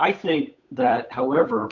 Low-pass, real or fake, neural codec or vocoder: 7.2 kHz; fake; codec, 16 kHz, 8 kbps, FunCodec, trained on Chinese and English, 25 frames a second